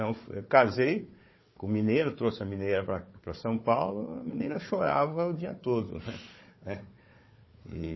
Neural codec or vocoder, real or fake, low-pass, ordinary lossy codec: codec, 16 kHz, 4 kbps, FunCodec, trained on Chinese and English, 50 frames a second; fake; 7.2 kHz; MP3, 24 kbps